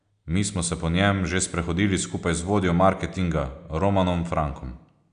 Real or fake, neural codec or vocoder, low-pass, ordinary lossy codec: real; none; 9.9 kHz; none